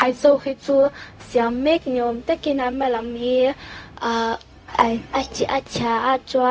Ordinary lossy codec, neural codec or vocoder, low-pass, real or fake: none; codec, 16 kHz, 0.4 kbps, LongCat-Audio-Codec; none; fake